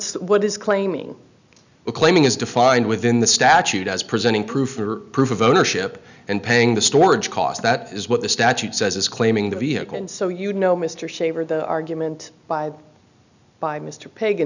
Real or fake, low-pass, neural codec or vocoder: real; 7.2 kHz; none